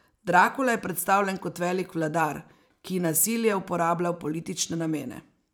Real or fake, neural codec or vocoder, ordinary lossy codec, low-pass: fake; vocoder, 44.1 kHz, 128 mel bands every 512 samples, BigVGAN v2; none; none